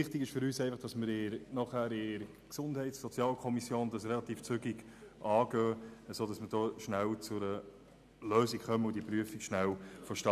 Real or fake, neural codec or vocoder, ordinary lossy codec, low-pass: real; none; none; 14.4 kHz